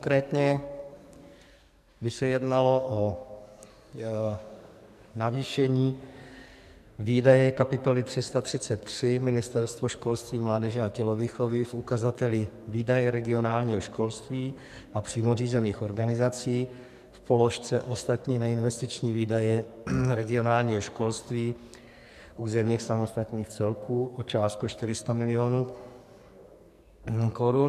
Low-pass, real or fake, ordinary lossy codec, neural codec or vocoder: 14.4 kHz; fake; MP3, 96 kbps; codec, 44.1 kHz, 2.6 kbps, SNAC